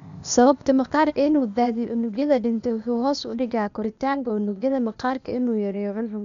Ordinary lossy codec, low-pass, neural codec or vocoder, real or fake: none; 7.2 kHz; codec, 16 kHz, 0.8 kbps, ZipCodec; fake